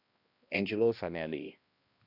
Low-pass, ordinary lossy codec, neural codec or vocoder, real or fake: 5.4 kHz; Opus, 64 kbps; codec, 16 kHz, 1 kbps, X-Codec, HuBERT features, trained on balanced general audio; fake